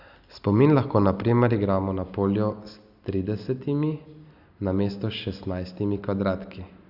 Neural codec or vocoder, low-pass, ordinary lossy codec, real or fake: none; 5.4 kHz; Opus, 64 kbps; real